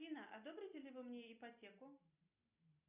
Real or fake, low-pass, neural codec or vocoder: real; 3.6 kHz; none